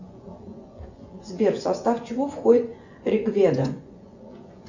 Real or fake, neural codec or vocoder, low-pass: fake; vocoder, 24 kHz, 100 mel bands, Vocos; 7.2 kHz